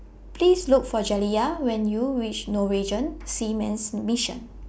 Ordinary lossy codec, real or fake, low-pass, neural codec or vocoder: none; real; none; none